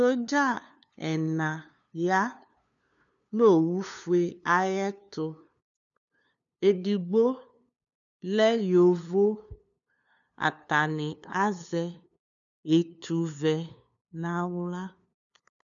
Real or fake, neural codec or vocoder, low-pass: fake; codec, 16 kHz, 2 kbps, FunCodec, trained on LibriTTS, 25 frames a second; 7.2 kHz